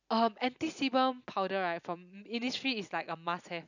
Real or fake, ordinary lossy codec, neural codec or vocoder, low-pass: real; none; none; 7.2 kHz